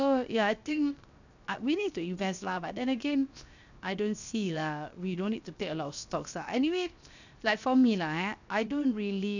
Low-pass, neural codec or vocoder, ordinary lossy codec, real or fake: 7.2 kHz; codec, 16 kHz, 0.7 kbps, FocalCodec; none; fake